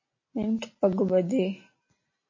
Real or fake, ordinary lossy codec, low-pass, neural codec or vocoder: real; MP3, 32 kbps; 7.2 kHz; none